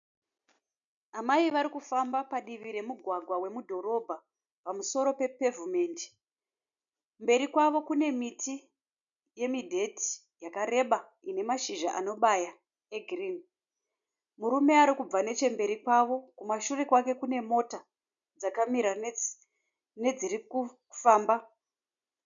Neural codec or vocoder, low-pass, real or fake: none; 7.2 kHz; real